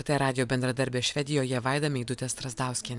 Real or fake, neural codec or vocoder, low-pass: fake; vocoder, 44.1 kHz, 128 mel bands every 256 samples, BigVGAN v2; 10.8 kHz